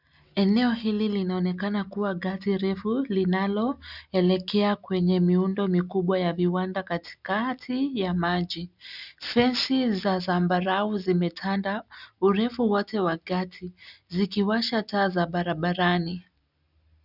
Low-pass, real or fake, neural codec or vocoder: 5.4 kHz; real; none